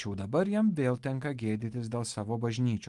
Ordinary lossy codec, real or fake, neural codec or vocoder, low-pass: Opus, 24 kbps; real; none; 10.8 kHz